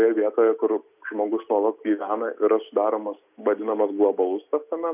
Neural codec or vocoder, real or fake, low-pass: none; real; 3.6 kHz